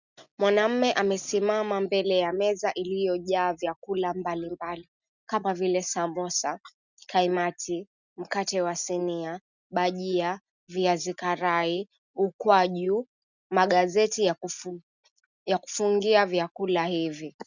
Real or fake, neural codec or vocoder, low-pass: real; none; 7.2 kHz